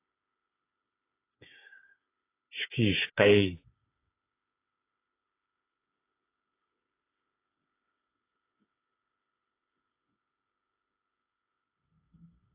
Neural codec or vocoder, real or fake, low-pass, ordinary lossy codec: codec, 16 kHz, 8 kbps, FreqCodec, smaller model; fake; 3.6 kHz; AAC, 24 kbps